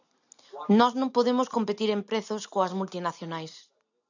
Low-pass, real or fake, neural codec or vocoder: 7.2 kHz; real; none